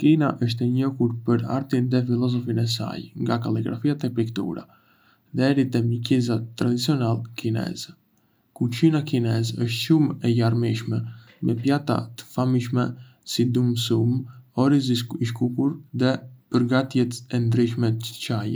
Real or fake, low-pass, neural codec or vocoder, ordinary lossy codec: real; none; none; none